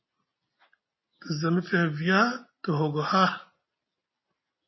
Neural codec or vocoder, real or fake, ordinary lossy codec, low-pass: none; real; MP3, 24 kbps; 7.2 kHz